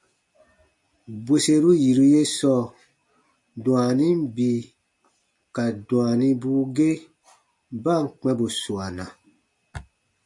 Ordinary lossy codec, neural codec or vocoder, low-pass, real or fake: MP3, 48 kbps; none; 10.8 kHz; real